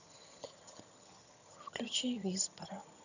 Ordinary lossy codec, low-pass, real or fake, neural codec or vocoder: none; 7.2 kHz; fake; vocoder, 22.05 kHz, 80 mel bands, HiFi-GAN